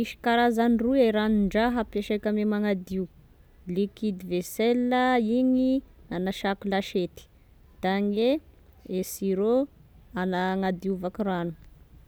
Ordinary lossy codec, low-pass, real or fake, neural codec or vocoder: none; none; real; none